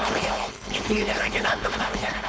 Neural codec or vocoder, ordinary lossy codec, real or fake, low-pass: codec, 16 kHz, 4.8 kbps, FACodec; none; fake; none